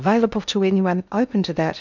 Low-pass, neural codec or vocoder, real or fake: 7.2 kHz; codec, 16 kHz in and 24 kHz out, 0.6 kbps, FocalCodec, streaming, 2048 codes; fake